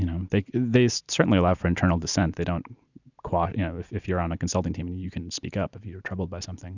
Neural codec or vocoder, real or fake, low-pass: none; real; 7.2 kHz